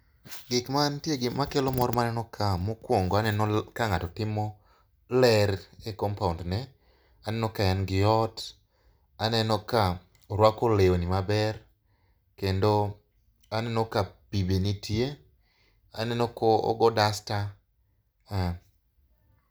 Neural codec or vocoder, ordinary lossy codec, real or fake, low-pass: none; none; real; none